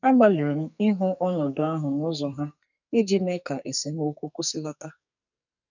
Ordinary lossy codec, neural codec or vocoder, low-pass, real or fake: none; codec, 44.1 kHz, 2.6 kbps, SNAC; 7.2 kHz; fake